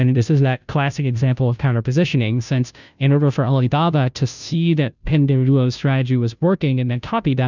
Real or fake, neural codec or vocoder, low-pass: fake; codec, 16 kHz, 0.5 kbps, FunCodec, trained on Chinese and English, 25 frames a second; 7.2 kHz